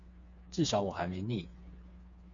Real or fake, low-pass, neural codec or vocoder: fake; 7.2 kHz; codec, 16 kHz, 4 kbps, FreqCodec, smaller model